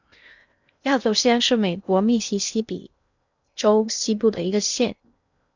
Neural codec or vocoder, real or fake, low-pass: codec, 16 kHz in and 24 kHz out, 0.6 kbps, FocalCodec, streaming, 2048 codes; fake; 7.2 kHz